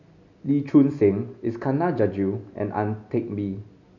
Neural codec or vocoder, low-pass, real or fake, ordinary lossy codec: none; 7.2 kHz; real; none